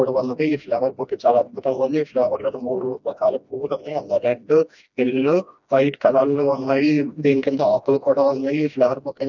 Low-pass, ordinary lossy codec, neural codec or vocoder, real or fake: 7.2 kHz; none; codec, 16 kHz, 1 kbps, FreqCodec, smaller model; fake